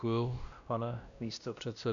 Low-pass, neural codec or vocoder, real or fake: 7.2 kHz; codec, 16 kHz, 1 kbps, X-Codec, WavLM features, trained on Multilingual LibriSpeech; fake